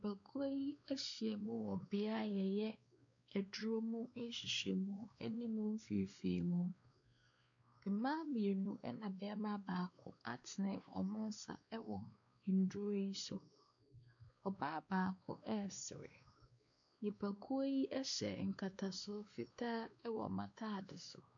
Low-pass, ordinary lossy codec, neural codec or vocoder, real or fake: 7.2 kHz; MP3, 64 kbps; codec, 16 kHz, 2 kbps, X-Codec, WavLM features, trained on Multilingual LibriSpeech; fake